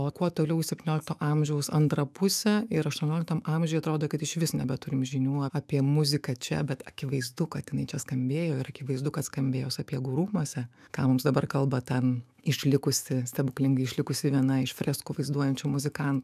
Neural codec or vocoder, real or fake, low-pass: autoencoder, 48 kHz, 128 numbers a frame, DAC-VAE, trained on Japanese speech; fake; 14.4 kHz